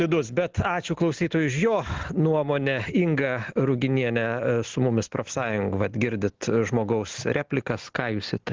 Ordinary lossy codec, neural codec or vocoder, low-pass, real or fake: Opus, 16 kbps; none; 7.2 kHz; real